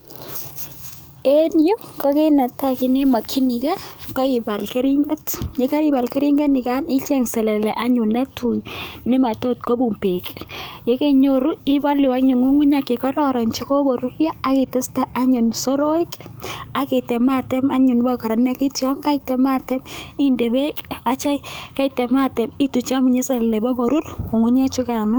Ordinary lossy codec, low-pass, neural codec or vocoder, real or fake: none; none; codec, 44.1 kHz, 7.8 kbps, DAC; fake